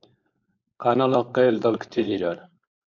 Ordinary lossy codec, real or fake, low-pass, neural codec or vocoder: AAC, 48 kbps; fake; 7.2 kHz; codec, 16 kHz, 4.8 kbps, FACodec